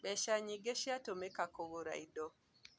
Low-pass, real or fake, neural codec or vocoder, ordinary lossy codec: none; real; none; none